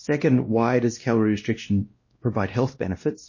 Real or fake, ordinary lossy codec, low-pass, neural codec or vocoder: fake; MP3, 32 kbps; 7.2 kHz; codec, 16 kHz, 1 kbps, X-Codec, WavLM features, trained on Multilingual LibriSpeech